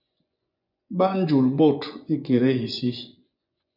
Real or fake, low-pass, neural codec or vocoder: fake; 5.4 kHz; vocoder, 24 kHz, 100 mel bands, Vocos